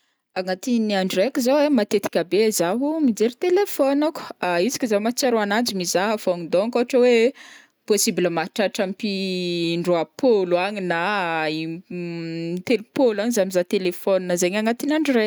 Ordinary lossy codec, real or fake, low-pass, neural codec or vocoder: none; real; none; none